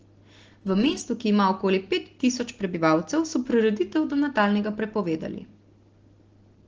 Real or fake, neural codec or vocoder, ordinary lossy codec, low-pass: real; none; Opus, 16 kbps; 7.2 kHz